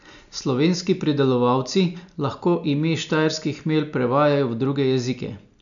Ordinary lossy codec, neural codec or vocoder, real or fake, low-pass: none; none; real; 7.2 kHz